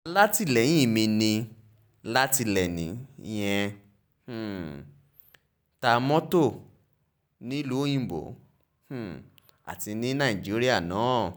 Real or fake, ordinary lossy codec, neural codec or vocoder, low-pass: real; none; none; none